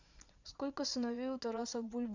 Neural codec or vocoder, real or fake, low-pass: vocoder, 22.05 kHz, 80 mel bands, Vocos; fake; 7.2 kHz